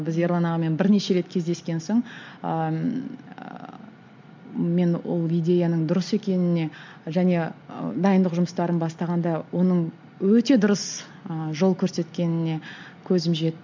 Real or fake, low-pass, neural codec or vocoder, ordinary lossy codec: real; 7.2 kHz; none; MP3, 64 kbps